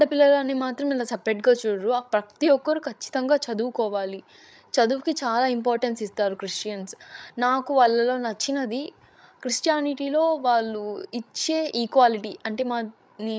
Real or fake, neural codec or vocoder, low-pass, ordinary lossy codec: fake; codec, 16 kHz, 16 kbps, FreqCodec, larger model; none; none